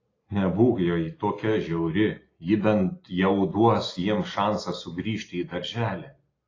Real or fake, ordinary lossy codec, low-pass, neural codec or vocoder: real; AAC, 32 kbps; 7.2 kHz; none